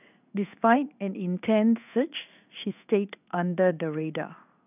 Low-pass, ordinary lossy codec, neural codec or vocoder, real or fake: 3.6 kHz; none; codec, 16 kHz in and 24 kHz out, 1 kbps, XY-Tokenizer; fake